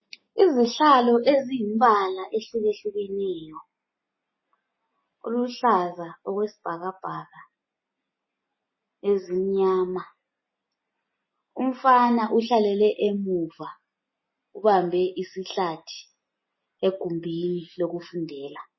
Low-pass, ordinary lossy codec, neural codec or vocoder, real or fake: 7.2 kHz; MP3, 24 kbps; none; real